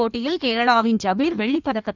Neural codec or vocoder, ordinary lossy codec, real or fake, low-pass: codec, 16 kHz in and 24 kHz out, 1.1 kbps, FireRedTTS-2 codec; none; fake; 7.2 kHz